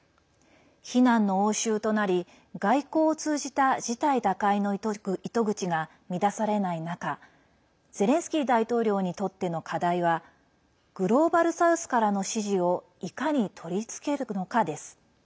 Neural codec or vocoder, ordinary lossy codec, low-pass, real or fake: none; none; none; real